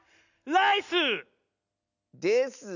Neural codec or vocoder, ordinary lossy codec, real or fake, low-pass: none; none; real; 7.2 kHz